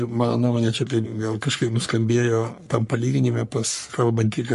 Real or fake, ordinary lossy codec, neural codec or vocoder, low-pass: fake; MP3, 48 kbps; codec, 44.1 kHz, 3.4 kbps, Pupu-Codec; 14.4 kHz